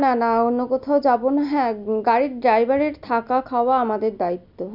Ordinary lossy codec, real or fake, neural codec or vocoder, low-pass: none; real; none; 5.4 kHz